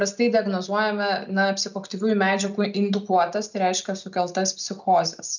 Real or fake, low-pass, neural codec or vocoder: real; 7.2 kHz; none